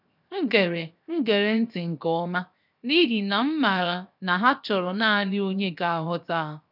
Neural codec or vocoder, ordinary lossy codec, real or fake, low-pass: codec, 16 kHz, 0.7 kbps, FocalCodec; none; fake; 5.4 kHz